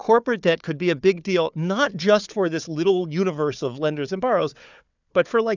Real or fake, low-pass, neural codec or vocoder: fake; 7.2 kHz; codec, 16 kHz, 4 kbps, FunCodec, trained on Chinese and English, 50 frames a second